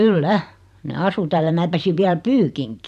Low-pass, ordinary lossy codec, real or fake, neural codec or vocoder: 14.4 kHz; none; fake; vocoder, 44.1 kHz, 128 mel bands every 512 samples, BigVGAN v2